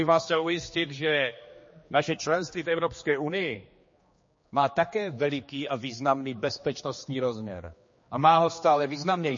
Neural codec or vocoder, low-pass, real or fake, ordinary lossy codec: codec, 16 kHz, 2 kbps, X-Codec, HuBERT features, trained on general audio; 7.2 kHz; fake; MP3, 32 kbps